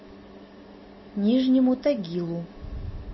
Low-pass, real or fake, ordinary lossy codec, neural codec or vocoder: 7.2 kHz; real; MP3, 24 kbps; none